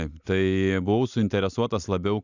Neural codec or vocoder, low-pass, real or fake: none; 7.2 kHz; real